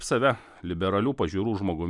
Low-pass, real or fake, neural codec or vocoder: 10.8 kHz; real; none